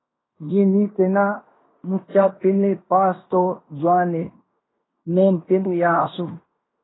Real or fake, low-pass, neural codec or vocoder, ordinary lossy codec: fake; 7.2 kHz; codec, 16 kHz in and 24 kHz out, 0.9 kbps, LongCat-Audio-Codec, fine tuned four codebook decoder; AAC, 16 kbps